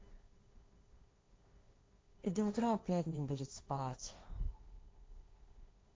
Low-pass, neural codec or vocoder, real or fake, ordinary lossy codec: none; codec, 16 kHz, 1.1 kbps, Voila-Tokenizer; fake; none